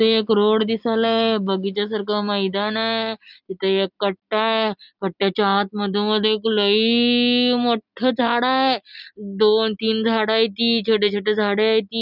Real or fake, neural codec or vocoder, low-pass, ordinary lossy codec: real; none; 5.4 kHz; none